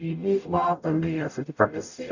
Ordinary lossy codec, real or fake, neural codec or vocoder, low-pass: none; fake; codec, 44.1 kHz, 0.9 kbps, DAC; 7.2 kHz